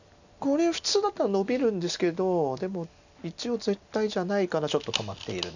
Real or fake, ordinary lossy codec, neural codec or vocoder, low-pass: real; none; none; 7.2 kHz